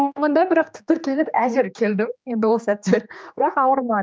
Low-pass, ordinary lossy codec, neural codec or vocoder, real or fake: none; none; codec, 16 kHz, 2 kbps, X-Codec, HuBERT features, trained on general audio; fake